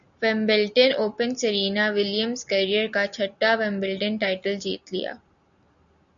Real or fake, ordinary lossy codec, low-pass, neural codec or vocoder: real; MP3, 96 kbps; 7.2 kHz; none